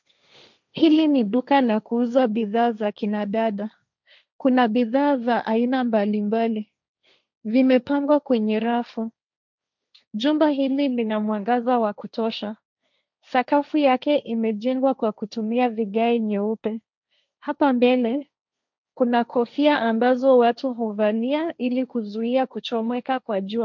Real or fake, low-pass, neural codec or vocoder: fake; 7.2 kHz; codec, 16 kHz, 1.1 kbps, Voila-Tokenizer